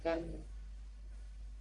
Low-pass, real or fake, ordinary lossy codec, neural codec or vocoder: 10.8 kHz; fake; MP3, 96 kbps; codec, 44.1 kHz, 1.7 kbps, Pupu-Codec